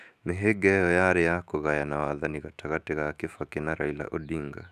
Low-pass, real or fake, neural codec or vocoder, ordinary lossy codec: 14.4 kHz; fake; autoencoder, 48 kHz, 128 numbers a frame, DAC-VAE, trained on Japanese speech; none